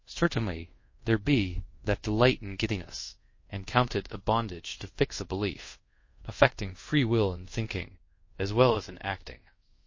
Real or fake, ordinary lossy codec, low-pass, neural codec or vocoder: fake; MP3, 32 kbps; 7.2 kHz; codec, 24 kHz, 0.5 kbps, DualCodec